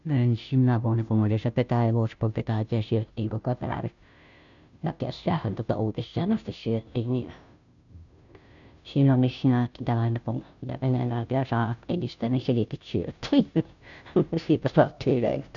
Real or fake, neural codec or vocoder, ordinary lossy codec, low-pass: fake; codec, 16 kHz, 0.5 kbps, FunCodec, trained on Chinese and English, 25 frames a second; none; 7.2 kHz